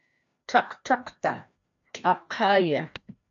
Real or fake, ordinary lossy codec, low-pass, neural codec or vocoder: fake; AAC, 64 kbps; 7.2 kHz; codec, 16 kHz, 1 kbps, FreqCodec, larger model